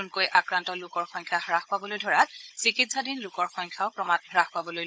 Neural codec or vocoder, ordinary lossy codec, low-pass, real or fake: codec, 16 kHz, 16 kbps, FunCodec, trained on Chinese and English, 50 frames a second; none; none; fake